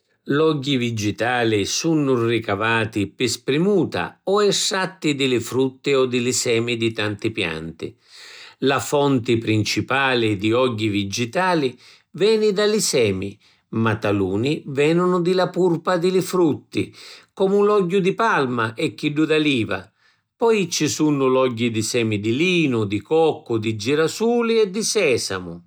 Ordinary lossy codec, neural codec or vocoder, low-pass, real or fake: none; none; none; real